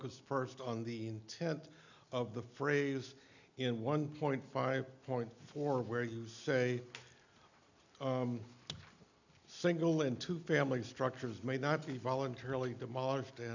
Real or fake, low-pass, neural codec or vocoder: real; 7.2 kHz; none